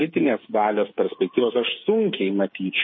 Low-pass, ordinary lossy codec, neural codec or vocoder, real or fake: 7.2 kHz; MP3, 24 kbps; codec, 16 kHz, 8 kbps, FreqCodec, smaller model; fake